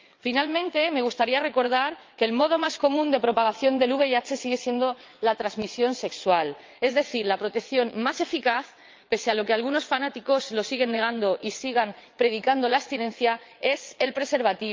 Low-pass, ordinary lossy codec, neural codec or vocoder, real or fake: 7.2 kHz; Opus, 24 kbps; vocoder, 22.05 kHz, 80 mel bands, WaveNeXt; fake